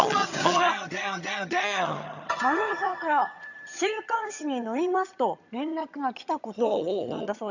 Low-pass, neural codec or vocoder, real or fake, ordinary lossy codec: 7.2 kHz; vocoder, 22.05 kHz, 80 mel bands, HiFi-GAN; fake; none